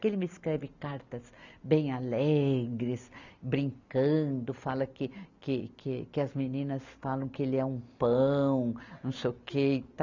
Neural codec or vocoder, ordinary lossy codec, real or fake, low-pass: none; none; real; 7.2 kHz